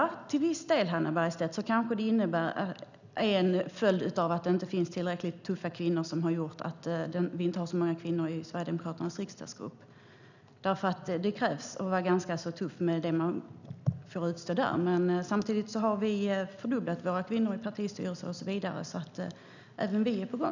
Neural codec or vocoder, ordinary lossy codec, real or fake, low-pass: none; none; real; 7.2 kHz